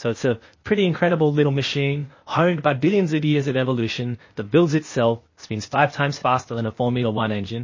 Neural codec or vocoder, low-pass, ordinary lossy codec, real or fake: codec, 16 kHz, 0.8 kbps, ZipCodec; 7.2 kHz; MP3, 32 kbps; fake